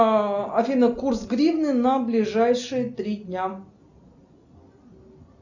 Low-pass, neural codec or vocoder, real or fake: 7.2 kHz; none; real